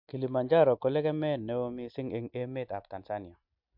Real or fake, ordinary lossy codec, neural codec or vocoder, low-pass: real; MP3, 48 kbps; none; 5.4 kHz